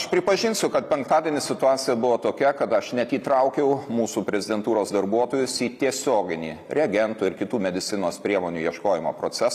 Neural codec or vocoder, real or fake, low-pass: none; real; 14.4 kHz